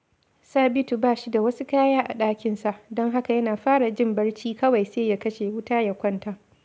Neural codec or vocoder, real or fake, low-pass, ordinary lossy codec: none; real; none; none